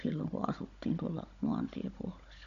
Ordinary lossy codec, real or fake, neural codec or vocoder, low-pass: none; fake; codec, 16 kHz, 16 kbps, FunCodec, trained on Chinese and English, 50 frames a second; 7.2 kHz